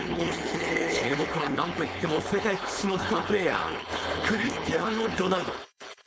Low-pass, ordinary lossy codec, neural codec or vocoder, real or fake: none; none; codec, 16 kHz, 4.8 kbps, FACodec; fake